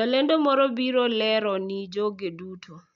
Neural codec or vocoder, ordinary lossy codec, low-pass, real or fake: none; none; 7.2 kHz; real